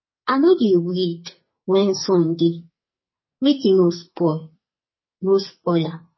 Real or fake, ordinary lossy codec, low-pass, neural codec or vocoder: fake; MP3, 24 kbps; 7.2 kHz; codec, 44.1 kHz, 2.6 kbps, SNAC